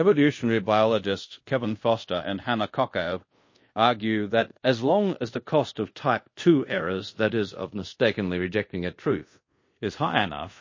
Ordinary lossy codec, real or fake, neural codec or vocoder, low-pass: MP3, 32 kbps; fake; codec, 24 kHz, 0.5 kbps, DualCodec; 7.2 kHz